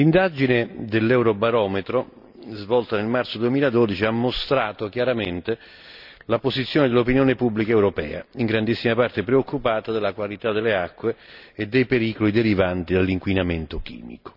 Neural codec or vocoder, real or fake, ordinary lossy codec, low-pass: none; real; none; 5.4 kHz